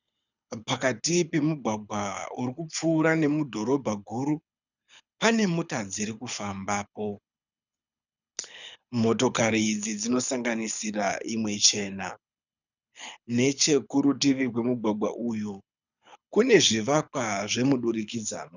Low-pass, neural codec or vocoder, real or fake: 7.2 kHz; codec, 24 kHz, 6 kbps, HILCodec; fake